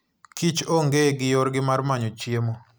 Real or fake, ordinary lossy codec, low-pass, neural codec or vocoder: real; none; none; none